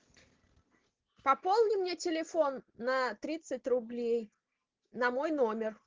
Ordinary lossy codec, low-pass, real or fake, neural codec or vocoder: Opus, 16 kbps; 7.2 kHz; real; none